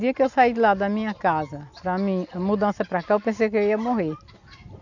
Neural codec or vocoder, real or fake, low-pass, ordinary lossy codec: none; real; 7.2 kHz; none